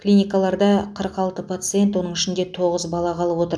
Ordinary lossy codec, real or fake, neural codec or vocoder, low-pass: none; real; none; none